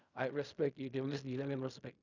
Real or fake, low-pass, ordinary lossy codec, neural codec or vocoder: fake; 7.2 kHz; none; codec, 16 kHz in and 24 kHz out, 0.4 kbps, LongCat-Audio-Codec, fine tuned four codebook decoder